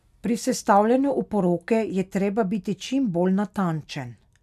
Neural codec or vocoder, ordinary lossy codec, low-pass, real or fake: none; none; 14.4 kHz; real